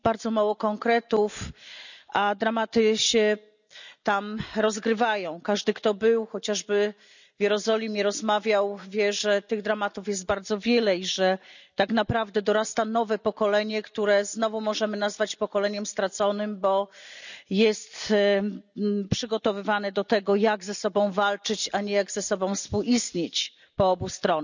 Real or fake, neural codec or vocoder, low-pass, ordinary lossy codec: real; none; 7.2 kHz; none